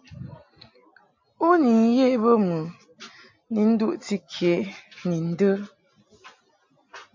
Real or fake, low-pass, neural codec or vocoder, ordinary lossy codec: real; 7.2 kHz; none; MP3, 48 kbps